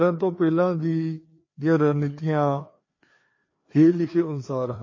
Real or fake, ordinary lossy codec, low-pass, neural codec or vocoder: fake; MP3, 32 kbps; 7.2 kHz; codec, 16 kHz, 2 kbps, FreqCodec, larger model